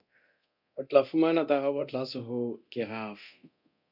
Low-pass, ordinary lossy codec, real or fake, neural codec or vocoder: 5.4 kHz; AAC, 48 kbps; fake; codec, 24 kHz, 0.9 kbps, DualCodec